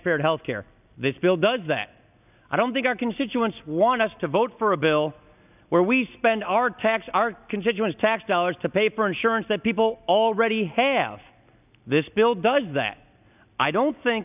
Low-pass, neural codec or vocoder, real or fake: 3.6 kHz; none; real